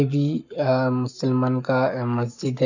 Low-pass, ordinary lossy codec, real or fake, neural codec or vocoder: 7.2 kHz; none; fake; codec, 44.1 kHz, 7.8 kbps, Pupu-Codec